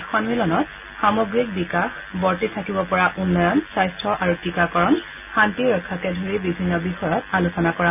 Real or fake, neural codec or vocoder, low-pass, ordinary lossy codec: real; none; 3.6 kHz; none